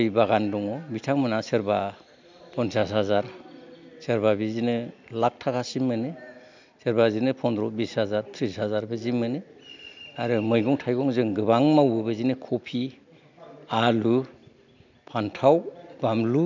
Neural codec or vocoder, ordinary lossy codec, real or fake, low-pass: none; MP3, 64 kbps; real; 7.2 kHz